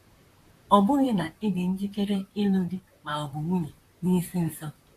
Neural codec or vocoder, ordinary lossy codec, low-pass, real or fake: vocoder, 44.1 kHz, 128 mel bands, Pupu-Vocoder; AAC, 64 kbps; 14.4 kHz; fake